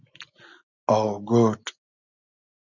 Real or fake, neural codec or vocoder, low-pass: real; none; 7.2 kHz